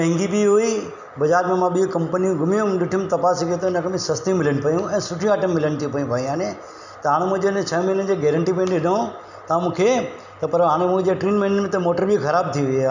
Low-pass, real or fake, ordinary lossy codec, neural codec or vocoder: 7.2 kHz; real; none; none